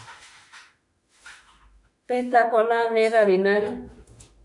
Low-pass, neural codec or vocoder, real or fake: 10.8 kHz; autoencoder, 48 kHz, 32 numbers a frame, DAC-VAE, trained on Japanese speech; fake